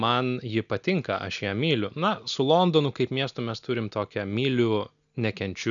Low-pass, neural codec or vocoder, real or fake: 7.2 kHz; none; real